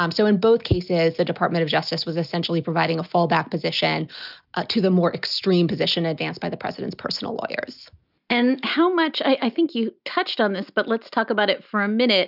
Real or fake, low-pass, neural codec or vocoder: real; 5.4 kHz; none